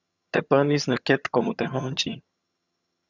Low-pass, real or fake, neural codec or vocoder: 7.2 kHz; fake; vocoder, 22.05 kHz, 80 mel bands, HiFi-GAN